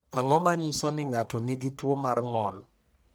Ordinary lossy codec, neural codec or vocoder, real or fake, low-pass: none; codec, 44.1 kHz, 1.7 kbps, Pupu-Codec; fake; none